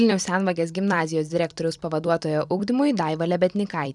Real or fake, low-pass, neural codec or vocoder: fake; 10.8 kHz; vocoder, 44.1 kHz, 128 mel bands every 256 samples, BigVGAN v2